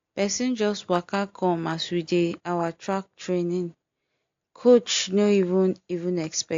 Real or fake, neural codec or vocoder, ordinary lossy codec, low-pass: real; none; AAC, 48 kbps; 7.2 kHz